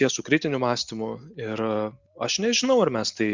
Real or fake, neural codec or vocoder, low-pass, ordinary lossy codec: real; none; 7.2 kHz; Opus, 64 kbps